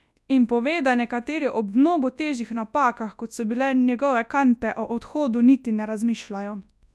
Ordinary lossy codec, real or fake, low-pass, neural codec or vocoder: none; fake; none; codec, 24 kHz, 0.9 kbps, WavTokenizer, large speech release